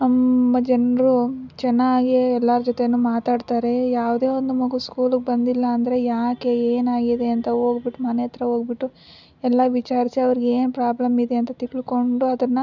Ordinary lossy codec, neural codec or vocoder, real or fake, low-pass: none; none; real; 7.2 kHz